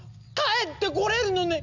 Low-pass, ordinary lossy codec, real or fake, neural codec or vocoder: 7.2 kHz; none; real; none